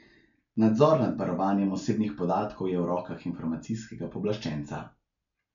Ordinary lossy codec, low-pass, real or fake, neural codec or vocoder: MP3, 64 kbps; 7.2 kHz; real; none